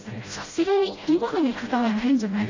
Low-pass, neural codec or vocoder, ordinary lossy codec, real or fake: 7.2 kHz; codec, 16 kHz, 0.5 kbps, FreqCodec, smaller model; AAC, 32 kbps; fake